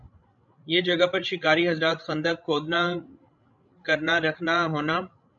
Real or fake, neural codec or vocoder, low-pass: fake; codec, 16 kHz, 16 kbps, FreqCodec, larger model; 7.2 kHz